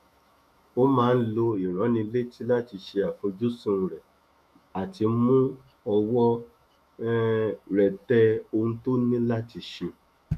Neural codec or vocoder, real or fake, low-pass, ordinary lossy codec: autoencoder, 48 kHz, 128 numbers a frame, DAC-VAE, trained on Japanese speech; fake; 14.4 kHz; MP3, 96 kbps